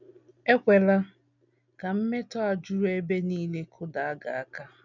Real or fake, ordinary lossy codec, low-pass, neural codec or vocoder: real; none; 7.2 kHz; none